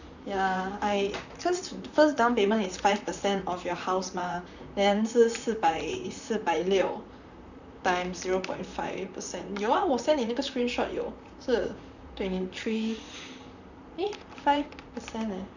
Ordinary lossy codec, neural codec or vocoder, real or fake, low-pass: none; vocoder, 44.1 kHz, 128 mel bands, Pupu-Vocoder; fake; 7.2 kHz